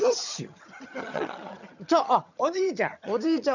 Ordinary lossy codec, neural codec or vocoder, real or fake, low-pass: none; vocoder, 22.05 kHz, 80 mel bands, HiFi-GAN; fake; 7.2 kHz